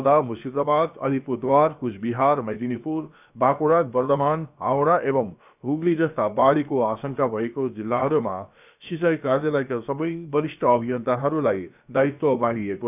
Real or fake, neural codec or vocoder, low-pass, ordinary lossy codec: fake; codec, 16 kHz, about 1 kbps, DyCAST, with the encoder's durations; 3.6 kHz; none